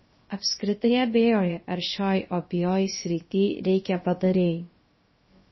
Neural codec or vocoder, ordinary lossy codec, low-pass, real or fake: codec, 16 kHz, about 1 kbps, DyCAST, with the encoder's durations; MP3, 24 kbps; 7.2 kHz; fake